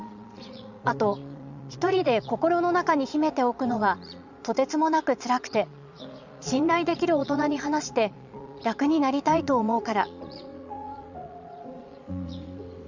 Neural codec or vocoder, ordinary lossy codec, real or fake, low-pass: vocoder, 22.05 kHz, 80 mel bands, Vocos; none; fake; 7.2 kHz